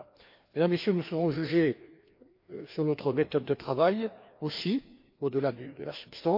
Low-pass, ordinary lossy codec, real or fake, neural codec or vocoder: 5.4 kHz; MP3, 32 kbps; fake; codec, 16 kHz, 2 kbps, FreqCodec, larger model